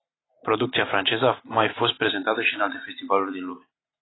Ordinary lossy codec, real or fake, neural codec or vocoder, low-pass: AAC, 16 kbps; real; none; 7.2 kHz